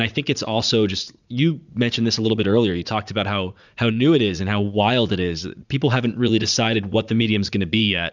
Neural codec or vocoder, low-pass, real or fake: vocoder, 44.1 kHz, 80 mel bands, Vocos; 7.2 kHz; fake